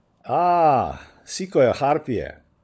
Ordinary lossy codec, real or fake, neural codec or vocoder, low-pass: none; fake; codec, 16 kHz, 16 kbps, FunCodec, trained on LibriTTS, 50 frames a second; none